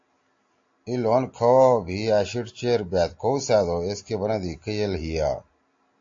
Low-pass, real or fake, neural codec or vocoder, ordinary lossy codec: 7.2 kHz; real; none; AAC, 48 kbps